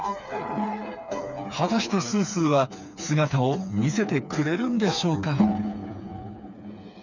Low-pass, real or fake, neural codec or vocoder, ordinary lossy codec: 7.2 kHz; fake; codec, 16 kHz, 4 kbps, FreqCodec, smaller model; none